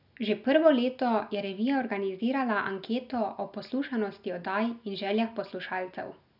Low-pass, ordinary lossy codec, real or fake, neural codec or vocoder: 5.4 kHz; none; real; none